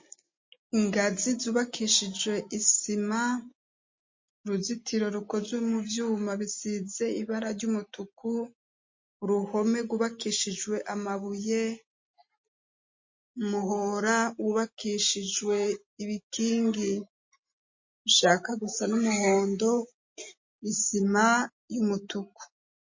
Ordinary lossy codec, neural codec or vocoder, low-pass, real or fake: MP3, 32 kbps; none; 7.2 kHz; real